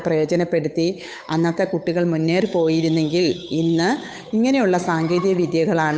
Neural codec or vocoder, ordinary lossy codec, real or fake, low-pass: codec, 16 kHz, 8 kbps, FunCodec, trained on Chinese and English, 25 frames a second; none; fake; none